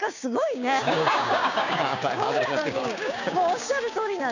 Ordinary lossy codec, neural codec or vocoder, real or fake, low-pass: none; codec, 16 kHz, 6 kbps, DAC; fake; 7.2 kHz